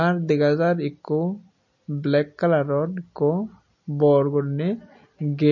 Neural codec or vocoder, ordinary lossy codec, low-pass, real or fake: none; MP3, 32 kbps; 7.2 kHz; real